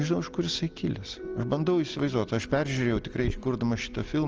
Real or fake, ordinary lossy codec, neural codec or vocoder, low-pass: real; Opus, 24 kbps; none; 7.2 kHz